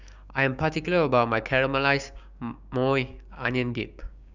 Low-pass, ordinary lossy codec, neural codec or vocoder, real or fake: 7.2 kHz; none; codec, 16 kHz, 6 kbps, DAC; fake